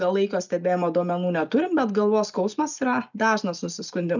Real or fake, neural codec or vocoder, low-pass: real; none; 7.2 kHz